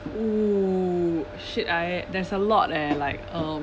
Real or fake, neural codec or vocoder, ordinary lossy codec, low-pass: real; none; none; none